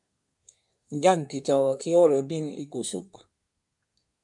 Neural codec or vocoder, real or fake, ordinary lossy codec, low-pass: codec, 24 kHz, 1 kbps, SNAC; fake; MP3, 96 kbps; 10.8 kHz